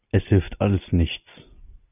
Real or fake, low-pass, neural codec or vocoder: real; 3.6 kHz; none